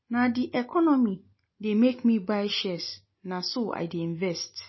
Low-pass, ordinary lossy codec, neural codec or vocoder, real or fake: 7.2 kHz; MP3, 24 kbps; none; real